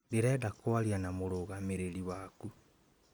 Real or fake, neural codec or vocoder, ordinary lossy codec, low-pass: real; none; none; none